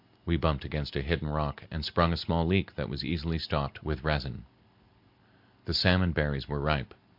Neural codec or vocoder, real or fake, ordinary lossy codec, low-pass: none; real; MP3, 48 kbps; 5.4 kHz